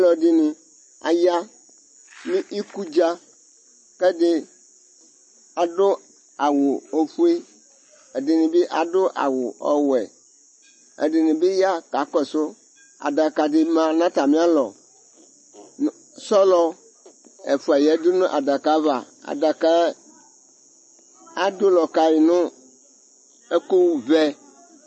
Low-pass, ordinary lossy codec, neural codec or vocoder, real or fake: 9.9 kHz; MP3, 32 kbps; none; real